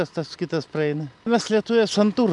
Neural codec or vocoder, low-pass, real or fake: none; 10.8 kHz; real